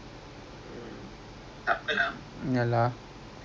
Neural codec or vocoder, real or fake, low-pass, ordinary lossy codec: none; real; none; none